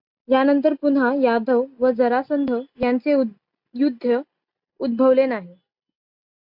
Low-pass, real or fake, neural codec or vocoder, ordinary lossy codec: 5.4 kHz; real; none; MP3, 48 kbps